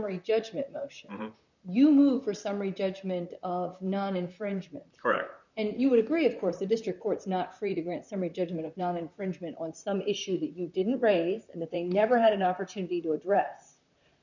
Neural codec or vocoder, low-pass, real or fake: vocoder, 44.1 kHz, 128 mel bands, Pupu-Vocoder; 7.2 kHz; fake